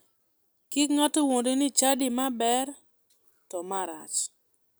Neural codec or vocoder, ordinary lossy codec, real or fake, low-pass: none; none; real; none